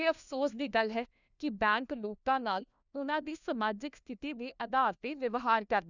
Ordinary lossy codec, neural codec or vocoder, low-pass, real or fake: none; codec, 16 kHz, 1 kbps, FunCodec, trained on LibriTTS, 50 frames a second; 7.2 kHz; fake